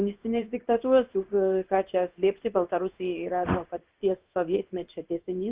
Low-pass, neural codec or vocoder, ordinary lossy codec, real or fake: 3.6 kHz; codec, 16 kHz in and 24 kHz out, 1 kbps, XY-Tokenizer; Opus, 24 kbps; fake